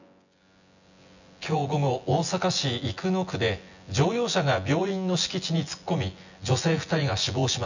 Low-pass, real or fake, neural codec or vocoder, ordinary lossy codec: 7.2 kHz; fake; vocoder, 24 kHz, 100 mel bands, Vocos; none